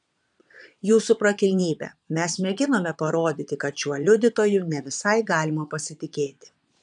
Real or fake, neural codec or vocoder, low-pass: fake; vocoder, 22.05 kHz, 80 mel bands, Vocos; 9.9 kHz